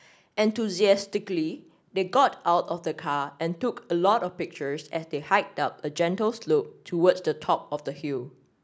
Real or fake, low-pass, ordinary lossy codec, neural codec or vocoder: real; none; none; none